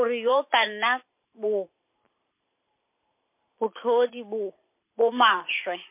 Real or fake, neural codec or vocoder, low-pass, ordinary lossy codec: fake; vocoder, 44.1 kHz, 128 mel bands every 512 samples, BigVGAN v2; 3.6 kHz; MP3, 24 kbps